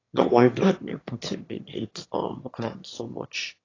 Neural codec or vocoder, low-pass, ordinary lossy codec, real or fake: autoencoder, 22.05 kHz, a latent of 192 numbers a frame, VITS, trained on one speaker; 7.2 kHz; AAC, 32 kbps; fake